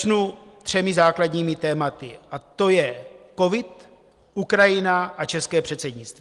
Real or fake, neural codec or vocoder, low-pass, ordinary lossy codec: real; none; 10.8 kHz; Opus, 24 kbps